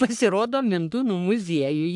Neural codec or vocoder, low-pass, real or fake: codec, 44.1 kHz, 3.4 kbps, Pupu-Codec; 10.8 kHz; fake